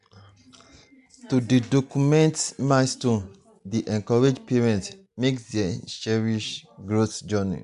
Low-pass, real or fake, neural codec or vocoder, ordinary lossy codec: 10.8 kHz; real; none; AAC, 96 kbps